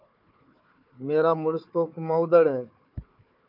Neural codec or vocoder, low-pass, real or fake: codec, 16 kHz, 4 kbps, FunCodec, trained on Chinese and English, 50 frames a second; 5.4 kHz; fake